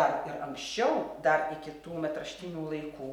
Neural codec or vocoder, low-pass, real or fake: none; 19.8 kHz; real